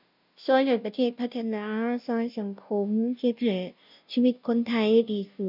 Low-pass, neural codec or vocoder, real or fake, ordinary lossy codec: 5.4 kHz; codec, 16 kHz, 0.5 kbps, FunCodec, trained on Chinese and English, 25 frames a second; fake; none